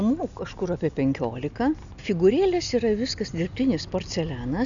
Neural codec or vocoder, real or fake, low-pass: none; real; 7.2 kHz